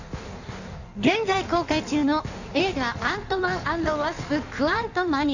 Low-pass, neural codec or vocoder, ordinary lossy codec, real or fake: 7.2 kHz; codec, 16 kHz in and 24 kHz out, 1.1 kbps, FireRedTTS-2 codec; none; fake